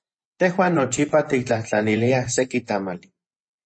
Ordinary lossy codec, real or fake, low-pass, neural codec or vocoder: MP3, 32 kbps; fake; 9.9 kHz; vocoder, 24 kHz, 100 mel bands, Vocos